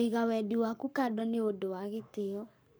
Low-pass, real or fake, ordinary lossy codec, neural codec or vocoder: none; fake; none; codec, 44.1 kHz, 7.8 kbps, Pupu-Codec